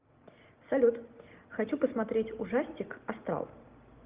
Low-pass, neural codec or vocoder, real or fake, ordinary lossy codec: 3.6 kHz; none; real; Opus, 24 kbps